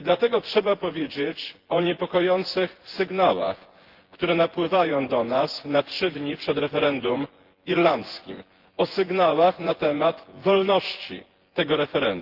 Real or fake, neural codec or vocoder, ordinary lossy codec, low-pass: fake; vocoder, 24 kHz, 100 mel bands, Vocos; Opus, 16 kbps; 5.4 kHz